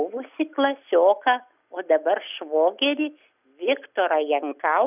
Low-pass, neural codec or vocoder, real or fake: 3.6 kHz; none; real